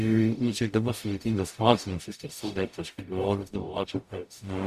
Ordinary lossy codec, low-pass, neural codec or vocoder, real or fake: Opus, 64 kbps; 14.4 kHz; codec, 44.1 kHz, 0.9 kbps, DAC; fake